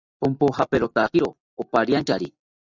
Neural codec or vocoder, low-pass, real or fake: none; 7.2 kHz; real